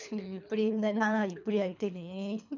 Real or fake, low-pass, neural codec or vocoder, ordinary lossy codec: fake; 7.2 kHz; codec, 24 kHz, 3 kbps, HILCodec; none